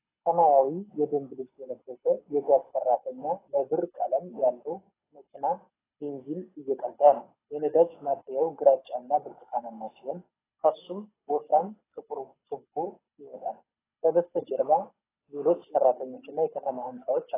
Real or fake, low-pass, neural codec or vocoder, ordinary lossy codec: fake; 3.6 kHz; codec, 24 kHz, 6 kbps, HILCodec; AAC, 16 kbps